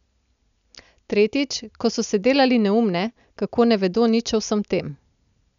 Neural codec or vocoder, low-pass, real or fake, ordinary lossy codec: none; 7.2 kHz; real; none